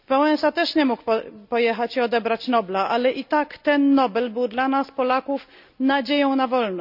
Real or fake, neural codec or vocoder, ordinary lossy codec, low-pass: real; none; none; 5.4 kHz